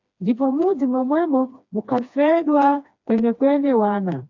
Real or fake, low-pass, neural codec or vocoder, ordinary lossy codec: fake; 7.2 kHz; codec, 16 kHz, 2 kbps, FreqCodec, smaller model; AAC, 48 kbps